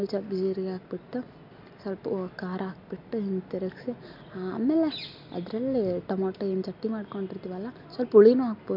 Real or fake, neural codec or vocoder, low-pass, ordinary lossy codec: real; none; 5.4 kHz; MP3, 48 kbps